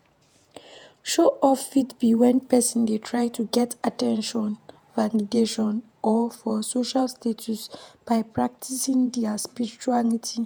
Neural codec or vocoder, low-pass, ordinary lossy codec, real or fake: vocoder, 48 kHz, 128 mel bands, Vocos; none; none; fake